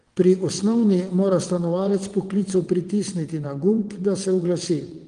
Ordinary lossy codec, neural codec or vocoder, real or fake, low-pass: Opus, 24 kbps; vocoder, 22.05 kHz, 80 mel bands, WaveNeXt; fake; 9.9 kHz